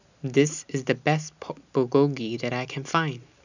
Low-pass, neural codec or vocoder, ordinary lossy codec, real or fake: 7.2 kHz; none; none; real